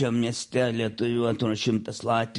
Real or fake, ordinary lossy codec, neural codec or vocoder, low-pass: real; MP3, 48 kbps; none; 10.8 kHz